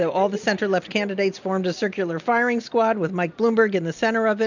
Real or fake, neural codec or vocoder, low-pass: real; none; 7.2 kHz